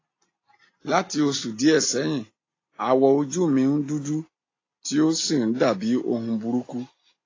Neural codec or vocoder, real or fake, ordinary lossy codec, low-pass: none; real; AAC, 32 kbps; 7.2 kHz